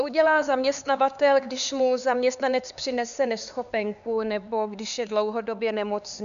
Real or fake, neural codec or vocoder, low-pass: fake; codec, 16 kHz, 4 kbps, X-Codec, HuBERT features, trained on LibriSpeech; 7.2 kHz